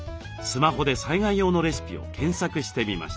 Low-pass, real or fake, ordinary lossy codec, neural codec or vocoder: none; real; none; none